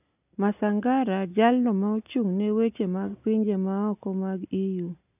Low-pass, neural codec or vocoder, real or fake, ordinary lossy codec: 3.6 kHz; none; real; none